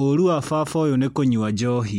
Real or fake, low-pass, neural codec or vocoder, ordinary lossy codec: real; 19.8 kHz; none; MP3, 64 kbps